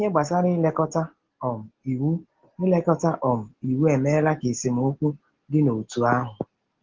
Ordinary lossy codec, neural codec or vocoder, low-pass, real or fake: Opus, 16 kbps; none; 7.2 kHz; real